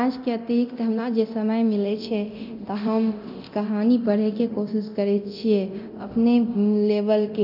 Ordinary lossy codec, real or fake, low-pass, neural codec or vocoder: none; fake; 5.4 kHz; codec, 24 kHz, 0.9 kbps, DualCodec